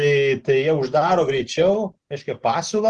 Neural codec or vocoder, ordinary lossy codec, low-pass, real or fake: none; Opus, 16 kbps; 10.8 kHz; real